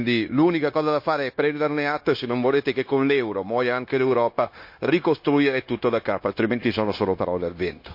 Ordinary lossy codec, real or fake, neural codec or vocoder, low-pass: MP3, 32 kbps; fake; codec, 16 kHz, 0.9 kbps, LongCat-Audio-Codec; 5.4 kHz